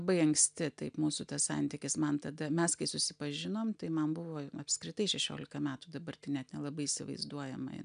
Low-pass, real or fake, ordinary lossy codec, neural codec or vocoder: 9.9 kHz; real; MP3, 96 kbps; none